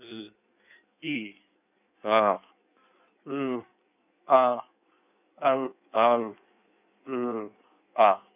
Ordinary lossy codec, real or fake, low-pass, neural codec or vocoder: none; fake; 3.6 kHz; codec, 16 kHz in and 24 kHz out, 1.1 kbps, FireRedTTS-2 codec